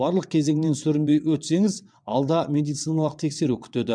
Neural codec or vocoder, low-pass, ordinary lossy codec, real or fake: vocoder, 22.05 kHz, 80 mel bands, WaveNeXt; 9.9 kHz; MP3, 96 kbps; fake